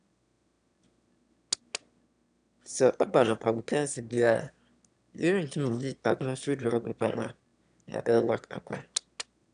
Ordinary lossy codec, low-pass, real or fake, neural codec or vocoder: none; 9.9 kHz; fake; autoencoder, 22.05 kHz, a latent of 192 numbers a frame, VITS, trained on one speaker